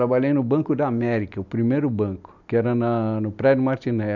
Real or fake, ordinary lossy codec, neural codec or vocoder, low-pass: real; none; none; 7.2 kHz